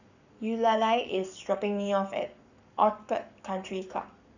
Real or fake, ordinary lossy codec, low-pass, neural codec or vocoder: fake; none; 7.2 kHz; codec, 44.1 kHz, 7.8 kbps, Pupu-Codec